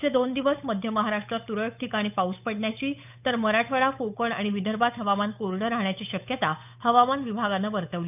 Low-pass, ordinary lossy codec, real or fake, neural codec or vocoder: 3.6 kHz; none; fake; codec, 16 kHz, 8 kbps, FunCodec, trained on Chinese and English, 25 frames a second